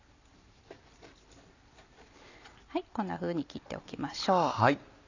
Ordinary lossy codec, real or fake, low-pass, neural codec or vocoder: none; real; 7.2 kHz; none